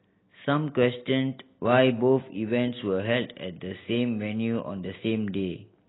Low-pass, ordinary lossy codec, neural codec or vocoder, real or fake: 7.2 kHz; AAC, 16 kbps; none; real